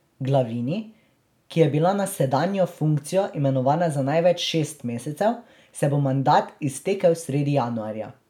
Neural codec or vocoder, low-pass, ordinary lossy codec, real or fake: none; 19.8 kHz; none; real